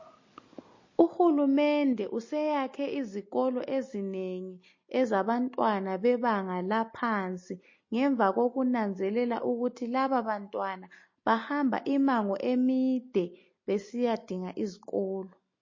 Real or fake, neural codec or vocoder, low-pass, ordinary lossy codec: real; none; 7.2 kHz; MP3, 32 kbps